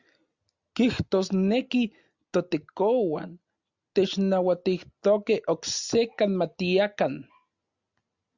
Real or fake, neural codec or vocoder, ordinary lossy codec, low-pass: real; none; Opus, 64 kbps; 7.2 kHz